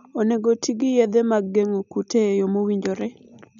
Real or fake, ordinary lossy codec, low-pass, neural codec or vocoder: real; none; 7.2 kHz; none